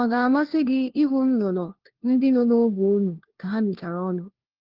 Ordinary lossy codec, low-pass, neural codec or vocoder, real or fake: Opus, 16 kbps; 5.4 kHz; codec, 16 kHz, 1 kbps, FunCodec, trained on LibriTTS, 50 frames a second; fake